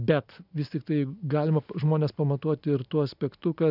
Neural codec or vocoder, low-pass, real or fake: none; 5.4 kHz; real